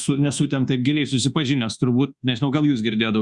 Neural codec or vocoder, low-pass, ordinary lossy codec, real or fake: codec, 24 kHz, 1.2 kbps, DualCodec; 10.8 kHz; Opus, 64 kbps; fake